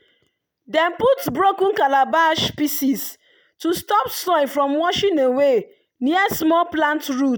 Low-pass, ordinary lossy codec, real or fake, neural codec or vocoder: none; none; real; none